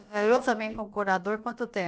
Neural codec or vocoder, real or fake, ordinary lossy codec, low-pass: codec, 16 kHz, about 1 kbps, DyCAST, with the encoder's durations; fake; none; none